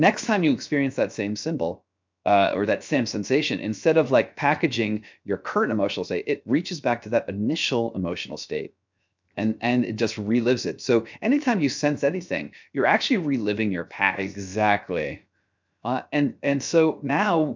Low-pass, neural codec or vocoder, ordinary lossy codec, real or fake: 7.2 kHz; codec, 16 kHz, 0.7 kbps, FocalCodec; MP3, 64 kbps; fake